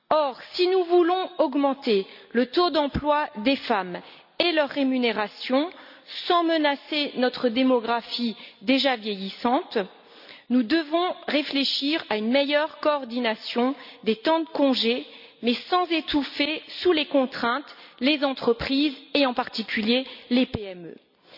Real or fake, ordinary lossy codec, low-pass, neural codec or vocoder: real; none; 5.4 kHz; none